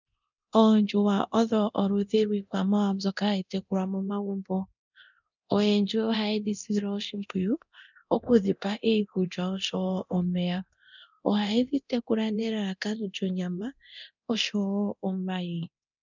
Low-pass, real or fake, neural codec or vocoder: 7.2 kHz; fake; codec, 24 kHz, 0.9 kbps, DualCodec